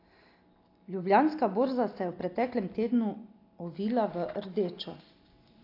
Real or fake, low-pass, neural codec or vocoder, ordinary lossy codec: real; 5.4 kHz; none; AAC, 32 kbps